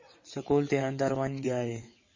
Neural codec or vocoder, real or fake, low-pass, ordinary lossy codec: vocoder, 22.05 kHz, 80 mel bands, WaveNeXt; fake; 7.2 kHz; MP3, 32 kbps